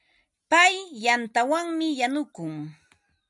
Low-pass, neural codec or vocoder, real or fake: 10.8 kHz; none; real